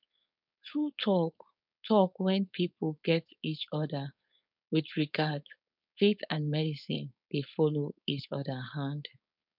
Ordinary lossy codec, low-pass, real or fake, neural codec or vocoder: none; 5.4 kHz; fake; codec, 16 kHz, 4.8 kbps, FACodec